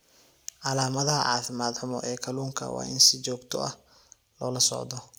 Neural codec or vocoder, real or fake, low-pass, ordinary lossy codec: none; real; none; none